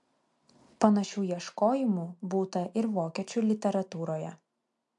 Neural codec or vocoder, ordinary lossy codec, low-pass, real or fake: none; MP3, 64 kbps; 10.8 kHz; real